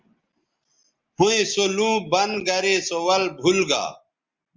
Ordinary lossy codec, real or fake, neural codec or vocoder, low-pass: Opus, 32 kbps; real; none; 7.2 kHz